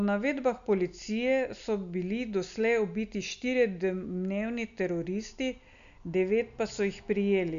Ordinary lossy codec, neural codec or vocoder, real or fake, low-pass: none; none; real; 7.2 kHz